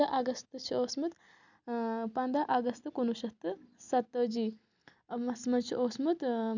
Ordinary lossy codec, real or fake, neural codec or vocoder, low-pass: none; real; none; 7.2 kHz